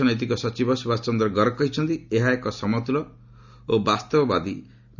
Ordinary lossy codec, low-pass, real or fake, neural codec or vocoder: none; 7.2 kHz; real; none